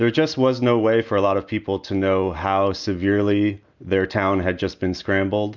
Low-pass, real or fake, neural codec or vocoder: 7.2 kHz; real; none